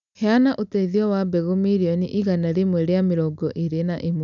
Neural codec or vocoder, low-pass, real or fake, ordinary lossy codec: none; 7.2 kHz; real; none